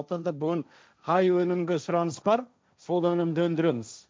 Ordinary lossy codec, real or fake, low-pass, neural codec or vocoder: none; fake; none; codec, 16 kHz, 1.1 kbps, Voila-Tokenizer